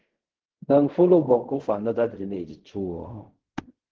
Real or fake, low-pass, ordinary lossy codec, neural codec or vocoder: fake; 7.2 kHz; Opus, 16 kbps; codec, 16 kHz in and 24 kHz out, 0.4 kbps, LongCat-Audio-Codec, fine tuned four codebook decoder